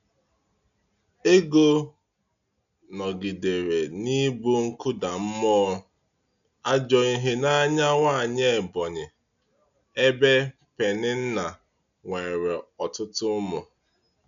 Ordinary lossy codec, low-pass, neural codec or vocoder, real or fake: none; 7.2 kHz; none; real